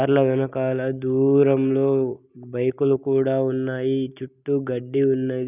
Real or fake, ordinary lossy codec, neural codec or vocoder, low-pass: real; none; none; 3.6 kHz